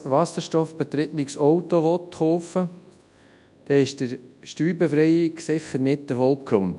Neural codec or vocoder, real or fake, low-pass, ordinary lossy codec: codec, 24 kHz, 0.9 kbps, WavTokenizer, large speech release; fake; 10.8 kHz; none